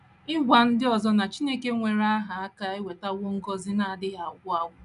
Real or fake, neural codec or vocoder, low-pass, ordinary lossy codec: real; none; 10.8 kHz; none